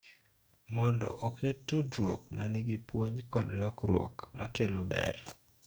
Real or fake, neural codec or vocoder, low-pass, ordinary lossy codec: fake; codec, 44.1 kHz, 2.6 kbps, DAC; none; none